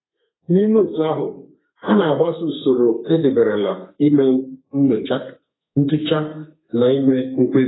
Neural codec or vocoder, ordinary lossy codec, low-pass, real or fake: codec, 32 kHz, 1.9 kbps, SNAC; AAC, 16 kbps; 7.2 kHz; fake